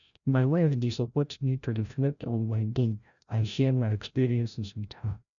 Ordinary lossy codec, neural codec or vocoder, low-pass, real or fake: none; codec, 16 kHz, 0.5 kbps, FreqCodec, larger model; 7.2 kHz; fake